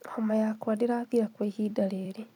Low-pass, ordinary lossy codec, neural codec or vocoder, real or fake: 19.8 kHz; none; vocoder, 44.1 kHz, 128 mel bands every 256 samples, BigVGAN v2; fake